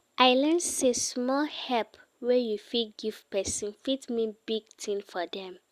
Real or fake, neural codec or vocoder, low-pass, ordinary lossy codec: real; none; 14.4 kHz; Opus, 64 kbps